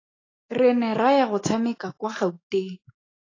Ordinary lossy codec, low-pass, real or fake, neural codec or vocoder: AAC, 32 kbps; 7.2 kHz; fake; autoencoder, 48 kHz, 128 numbers a frame, DAC-VAE, trained on Japanese speech